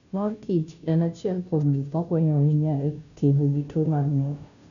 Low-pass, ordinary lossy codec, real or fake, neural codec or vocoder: 7.2 kHz; none; fake; codec, 16 kHz, 0.5 kbps, FunCodec, trained on Chinese and English, 25 frames a second